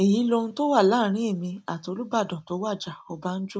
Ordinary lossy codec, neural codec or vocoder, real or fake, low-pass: none; none; real; none